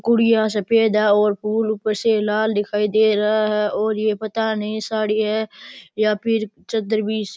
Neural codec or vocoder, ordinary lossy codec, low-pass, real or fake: none; none; none; real